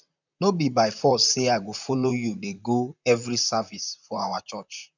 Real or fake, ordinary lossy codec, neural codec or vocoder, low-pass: fake; none; vocoder, 44.1 kHz, 128 mel bands, Pupu-Vocoder; 7.2 kHz